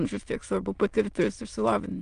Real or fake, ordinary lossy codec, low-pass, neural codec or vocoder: fake; Opus, 32 kbps; 9.9 kHz; autoencoder, 22.05 kHz, a latent of 192 numbers a frame, VITS, trained on many speakers